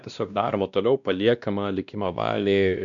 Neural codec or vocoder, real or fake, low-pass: codec, 16 kHz, 1 kbps, X-Codec, WavLM features, trained on Multilingual LibriSpeech; fake; 7.2 kHz